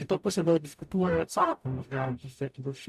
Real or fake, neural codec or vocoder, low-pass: fake; codec, 44.1 kHz, 0.9 kbps, DAC; 14.4 kHz